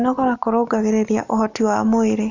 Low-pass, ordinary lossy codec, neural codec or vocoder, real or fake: 7.2 kHz; none; none; real